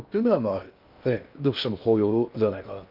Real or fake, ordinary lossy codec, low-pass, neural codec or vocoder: fake; Opus, 32 kbps; 5.4 kHz; codec, 16 kHz in and 24 kHz out, 0.6 kbps, FocalCodec, streaming, 2048 codes